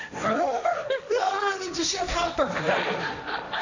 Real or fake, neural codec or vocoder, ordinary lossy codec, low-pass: fake; codec, 16 kHz, 1.1 kbps, Voila-Tokenizer; none; 7.2 kHz